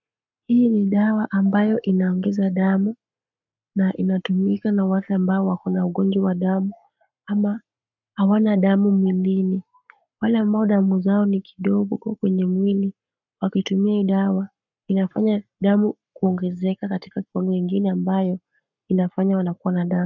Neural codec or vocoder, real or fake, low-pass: codec, 44.1 kHz, 7.8 kbps, Pupu-Codec; fake; 7.2 kHz